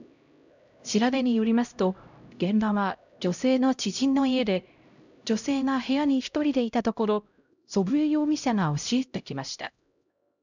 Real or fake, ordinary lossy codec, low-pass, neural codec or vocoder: fake; none; 7.2 kHz; codec, 16 kHz, 0.5 kbps, X-Codec, HuBERT features, trained on LibriSpeech